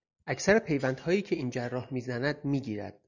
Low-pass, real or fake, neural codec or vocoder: 7.2 kHz; real; none